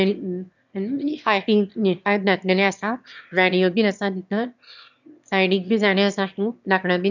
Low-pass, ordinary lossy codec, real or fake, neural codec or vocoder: 7.2 kHz; none; fake; autoencoder, 22.05 kHz, a latent of 192 numbers a frame, VITS, trained on one speaker